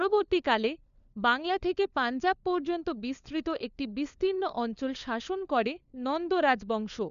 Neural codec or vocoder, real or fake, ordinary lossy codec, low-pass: codec, 16 kHz, 4 kbps, FunCodec, trained on LibriTTS, 50 frames a second; fake; none; 7.2 kHz